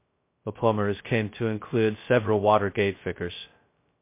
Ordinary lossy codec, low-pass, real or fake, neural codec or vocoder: MP3, 24 kbps; 3.6 kHz; fake; codec, 16 kHz, 0.2 kbps, FocalCodec